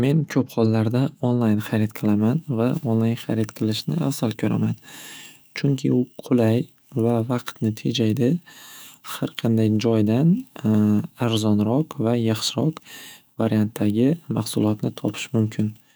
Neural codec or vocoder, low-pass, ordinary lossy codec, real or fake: autoencoder, 48 kHz, 128 numbers a frame, DAC-VAE, trained on Japanese speech; none; none; fake